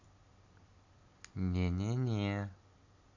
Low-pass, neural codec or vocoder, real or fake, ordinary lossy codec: 7.2 kHz; vocoder, 44.1 kHz, 128 mel bands every 256 samples, BigVGAN v2; fake; AAC, 48 kbps